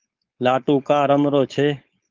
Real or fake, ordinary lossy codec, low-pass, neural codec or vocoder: fake; Opus, 32 kbps; 7.2 kHz; codec, 16 kHz, 4.8 kbps, FACodec